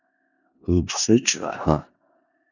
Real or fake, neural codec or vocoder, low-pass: fake; codec, 16 kHz in and 24 kHz out, 0.4 kbps, LongCat-Audio-Codec, four codebook decoder; 7.2 kHz